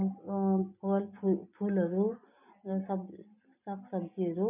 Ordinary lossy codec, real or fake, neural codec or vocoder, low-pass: none; real; none; 3.6 kHz